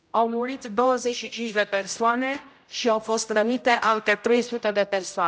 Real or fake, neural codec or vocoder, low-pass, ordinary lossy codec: fake; codec, 16 kHz, 0.5 kbps, X-Codec, HuBERT features, trained on general audio; none; none